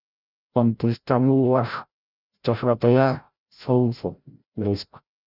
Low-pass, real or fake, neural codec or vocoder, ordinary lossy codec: 5.4 kHz; fake; codec, 16 kHz, 0.5 kbps, FreqCodec, larger model; Opus, 64 kbps